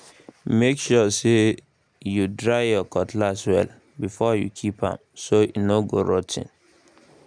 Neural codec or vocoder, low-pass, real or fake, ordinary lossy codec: none; 9.9 kHz; real; none